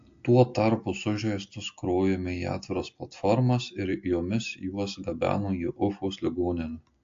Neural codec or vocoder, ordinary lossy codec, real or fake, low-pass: none; AAC, 48 kbps; real; 7.2 kHz